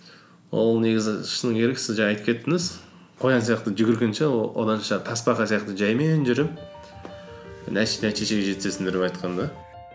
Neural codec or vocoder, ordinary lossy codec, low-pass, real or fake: none; none; none; real